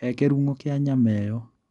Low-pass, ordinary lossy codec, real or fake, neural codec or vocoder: 10.8 kHz; none; real; none